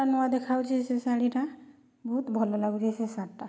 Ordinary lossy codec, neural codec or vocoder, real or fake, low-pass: none; none; real; none